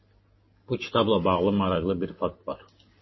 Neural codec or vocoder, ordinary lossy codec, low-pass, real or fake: none; MP3, 24 kbps; 7.2 kHz; real